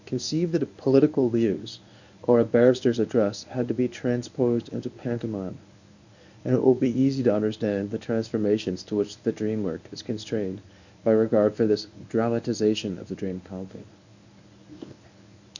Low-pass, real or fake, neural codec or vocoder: 7.2 kHz; fake; codec, 24 kHz, 0.9 kbps, WavTokenizer, medium speech release version 1